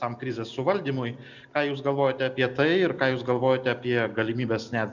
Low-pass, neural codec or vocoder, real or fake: 7.2 kHz; none; real